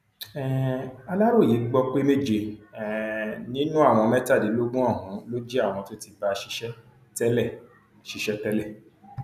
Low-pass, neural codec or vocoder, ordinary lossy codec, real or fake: 14.4 kHz; none; none; real